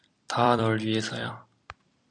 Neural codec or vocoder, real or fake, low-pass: vocoder, 44.1 kHz, 128 mel bands every 256 samples, BigVGAN v2; fake; 9.9 kHz